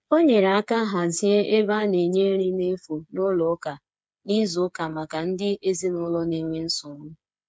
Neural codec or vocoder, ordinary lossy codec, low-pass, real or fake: codec, 16 kHz, 8 kbps, FreqCodec, smaller model; none; none; fake